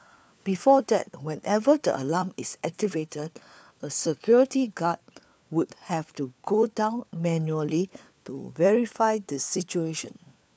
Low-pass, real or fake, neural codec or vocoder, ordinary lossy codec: none; fake; codec, 16 kHz, 4 kbps, FunCodec, trained on LibriTTS, 50 frames a second; none